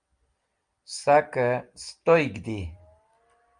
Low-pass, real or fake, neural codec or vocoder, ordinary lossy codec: 9.9 kHz; real; none; Opus, 32 kbps